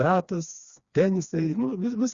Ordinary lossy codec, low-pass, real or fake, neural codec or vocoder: Opus, 64 kbps; 7.2 kHz; fake; codec, 16 kHz, 2 kbps, FreqCodec, smaller model